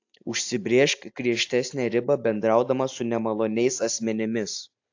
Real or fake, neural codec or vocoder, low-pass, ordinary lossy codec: real; none; 7.2 kHz; AAC, 48 kbps